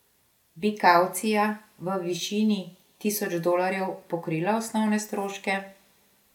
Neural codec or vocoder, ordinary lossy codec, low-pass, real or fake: none; none; 19.8 kHz; real